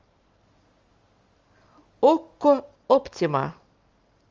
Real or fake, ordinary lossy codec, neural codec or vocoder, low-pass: real; Opus, 32 kbps; none; 7.2 kHz